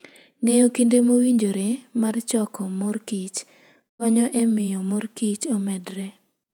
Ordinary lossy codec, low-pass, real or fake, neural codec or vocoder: none; 19.8 kHz; fake; vocoder, 48 kHz, 128 mel bands, Vocos